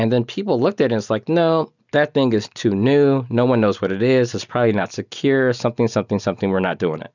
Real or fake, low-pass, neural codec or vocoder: real; 7.2 kHz; none